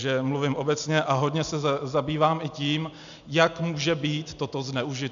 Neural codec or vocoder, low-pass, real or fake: none; 7.2 kHz; real